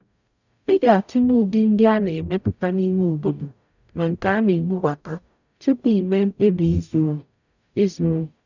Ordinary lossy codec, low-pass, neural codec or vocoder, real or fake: none; 7.2 kHz; codec, 44.1 kHz, 0.9 kbps, DAC; fake